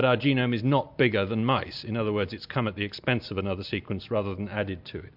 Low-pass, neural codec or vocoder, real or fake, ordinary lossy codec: 5.4 kHz; none; real; MP3, 48 kbps